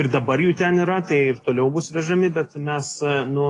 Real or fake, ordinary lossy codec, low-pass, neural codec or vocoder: fake; AAC, 32 kbps; 10.8 kHz; codec, 44.1 kHz, 7.8 kbps, DAC